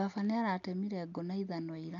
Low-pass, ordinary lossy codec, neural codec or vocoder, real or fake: 7.2 kHz; none; none; real